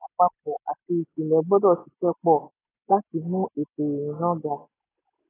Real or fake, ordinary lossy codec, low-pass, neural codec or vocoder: real; AAC, 16 kbps; 3.6 kHz; none